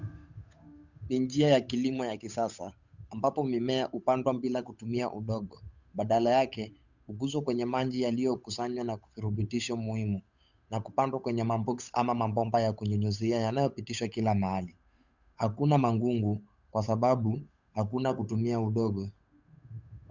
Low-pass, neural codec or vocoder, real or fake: 7.2 kHz; codec, 16 kHz, 8 kbps, FunCodec, trained on Chinese and English, 25 frames a second; fake